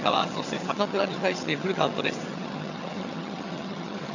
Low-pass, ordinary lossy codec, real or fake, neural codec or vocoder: 7.2 kHz; none; fake; vocoder, 22.05 kHz, 80 mel bands, HiFi-GAN